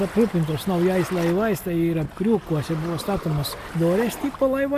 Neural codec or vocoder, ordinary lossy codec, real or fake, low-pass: none; AAC, 96 kbps; real; 14.4 kHz